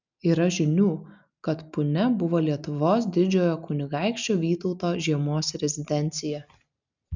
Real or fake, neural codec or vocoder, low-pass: real; none; 7.2 kHz